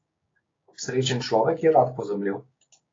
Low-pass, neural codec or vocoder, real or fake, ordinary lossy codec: 7.2 kHz; codec, 16 kHz, 6 kbps, DAC; fake; AAC, 32 kbps